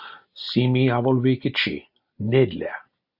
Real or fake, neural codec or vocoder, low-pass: real; none; 5.4 kHz